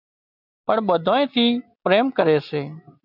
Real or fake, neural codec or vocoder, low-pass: real; none; 5.4 kHz